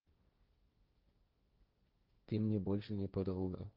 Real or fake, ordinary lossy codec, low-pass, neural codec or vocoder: fake; Opus, 32 kbps; 5.4 kHz; codec, 16 kHz, 1.1 kbps, Voila-Tokenizer